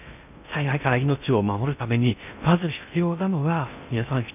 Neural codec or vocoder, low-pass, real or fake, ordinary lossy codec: codec, 16 kHz in and 24 kHz out, 0.6 kbps, FocalCodec, streaming, 2048 codes; 3.6 kHz; fake; none